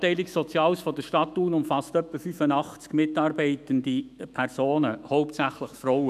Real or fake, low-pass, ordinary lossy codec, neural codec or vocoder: fake; 14.4 kHz; none; autoencoder, 48 kHz, 128 numbers a frame, DAC-VAE, trained on Japanese speech